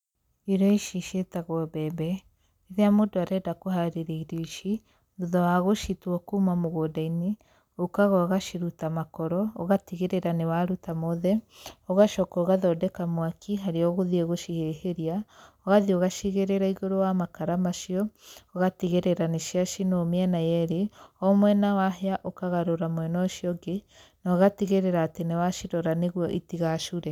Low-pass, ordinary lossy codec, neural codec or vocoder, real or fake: 19.8 kHz; none; none; real